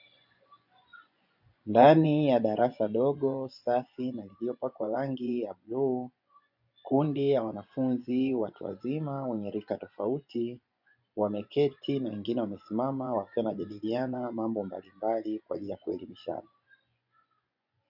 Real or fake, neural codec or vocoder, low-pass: fake; vocoder, 24 kHz, 100 mel bands, Vocos; 5.4 kHz